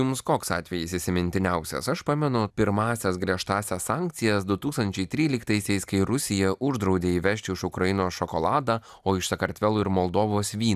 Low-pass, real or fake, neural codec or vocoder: 14.4 kHz; real; none